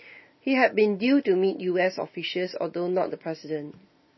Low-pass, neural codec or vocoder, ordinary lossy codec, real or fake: 7.2 kHz; none; MP3, 24 kbps; real